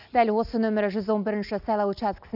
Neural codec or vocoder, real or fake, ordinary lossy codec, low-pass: none; real; none; 5.4 kHz